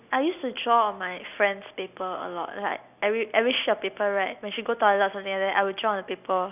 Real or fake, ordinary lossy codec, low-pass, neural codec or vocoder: real; none; 3.6 kHz; none